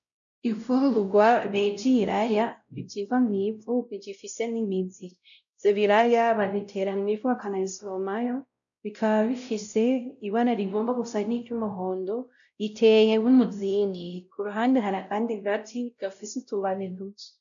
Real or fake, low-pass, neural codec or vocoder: fake; 7.2 kHz; codec, 16 kHz, 0.5 kbps, X-Codec, WavLM features, trained on Multilingual LibriSpeech